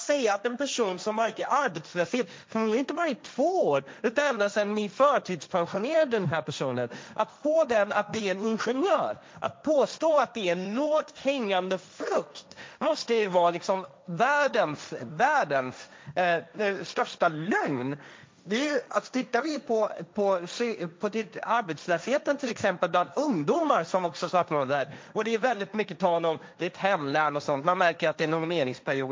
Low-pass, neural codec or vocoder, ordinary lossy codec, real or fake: none; codec, 16 kHz, 1.1 kbps, Voila-Tokenizer; none; fake